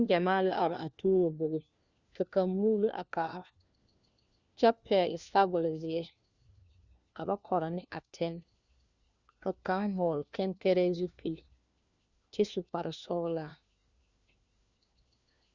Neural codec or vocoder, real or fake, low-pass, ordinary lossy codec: codec, 16 kHz, 1 kbps, FunCodec, trained on LibriTTS, 50 frames a second; fake; 7.2 kHz; Opus, 64 kbps